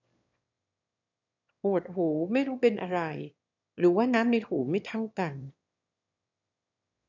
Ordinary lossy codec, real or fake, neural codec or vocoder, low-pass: none; fake; autoencoder, 22.05 kHz, a latent of 192 numbers a frame, VITS, trained on one speaker; 7.2 kHz